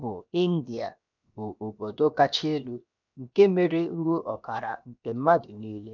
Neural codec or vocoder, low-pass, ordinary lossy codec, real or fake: codec, 16 kHz, 0.7 kbps, FocalCodec; 7.2 kHz; none; fake